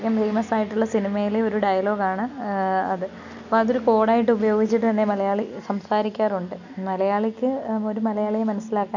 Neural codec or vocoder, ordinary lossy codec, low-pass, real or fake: none; none; 7.2 kHz; real